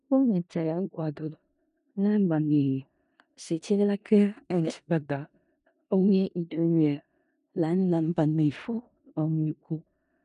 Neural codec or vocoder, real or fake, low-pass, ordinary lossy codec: codec, 16 kHz in and 24 kHz out, 0.4 kbps, LongCat-Audio-Codec, four codebook decoder; fake; 10.8 kHz; none